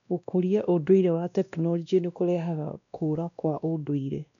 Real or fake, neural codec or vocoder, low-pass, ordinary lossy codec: fake; codec, 16 kHz, 1 kbps, X-Codec, WavLM features, trained on Multilingual LibriSpeech; 7.2 kHz; none